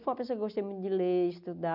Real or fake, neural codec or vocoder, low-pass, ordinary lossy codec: real; none; 5.4 kHz; none